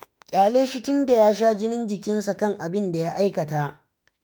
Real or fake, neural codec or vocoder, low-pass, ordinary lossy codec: fake; autoencoder, 48 kHz, 32 numbers a frame, DAC-VAE, trained on Japanese speech; none; none